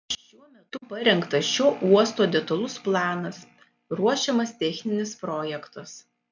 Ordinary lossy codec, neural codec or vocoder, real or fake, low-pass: MP3, 64 kbps; none; real; 7.2 kHz